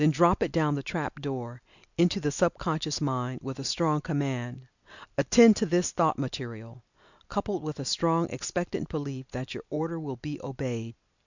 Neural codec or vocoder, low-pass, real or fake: none; 7.2 kHz; real